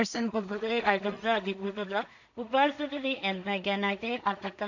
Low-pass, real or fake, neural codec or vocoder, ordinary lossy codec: 7.2 kHz; fake; codec, 16 kHz in and 24 kHz out, 0.4 kbps, LongCat-Audio-Codec, two codebook decoder; none